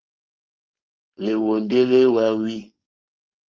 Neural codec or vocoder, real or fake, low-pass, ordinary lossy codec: codec, 16 kHz, 4.8 kbps, FACodec; fake; 7.2 kHz; Opus, 32 kbps